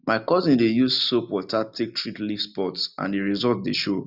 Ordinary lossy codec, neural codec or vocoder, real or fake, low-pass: none; none; real; 5.4 kHz